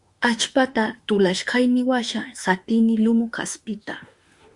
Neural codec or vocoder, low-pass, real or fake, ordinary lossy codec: autoencoder, 48 kHz, 32 numbers a frame, DAC-VAE, trained on Japanese speech; 10.8 kHz; fake; Opus, 64 kbps